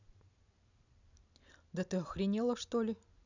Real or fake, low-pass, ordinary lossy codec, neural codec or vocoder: real; 7.2 kHz; none; none